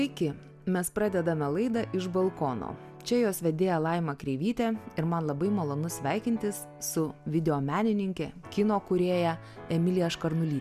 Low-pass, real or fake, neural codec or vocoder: 14.4 kHz; real; none